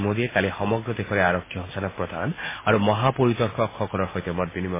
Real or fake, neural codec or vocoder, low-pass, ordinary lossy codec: real; none; 3.6 kHz; MP3, 16 kbps